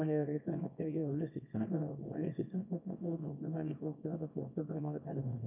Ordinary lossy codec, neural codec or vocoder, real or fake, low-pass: none; codec, 24 kHz, 0.9 kbps, WavTokenizer, small release; fake; 3.6 kHz